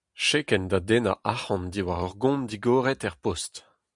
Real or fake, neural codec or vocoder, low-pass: real; none; 10.8 kHz